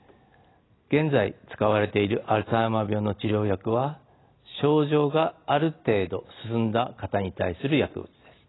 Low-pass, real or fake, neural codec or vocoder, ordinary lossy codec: 7.2 kHz; real; none; AAC, 16 kbps